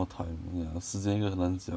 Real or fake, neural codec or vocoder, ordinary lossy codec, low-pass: real; none; none; none